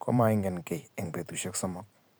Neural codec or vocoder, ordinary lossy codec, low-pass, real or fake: vocoder, 44.1 kHz, 128 mel bands every 512 samples, BigVGAN v2; none; none; fake